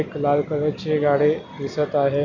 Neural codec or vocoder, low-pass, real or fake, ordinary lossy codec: none; 7.2 kHz; real; none